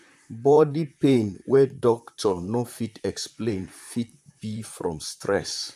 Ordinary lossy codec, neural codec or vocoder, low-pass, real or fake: MP3, 96 kbps; vocoder, 44.1 kHz, 128 mel bands, Pupu-Vocoder; 14.4 kHz; fake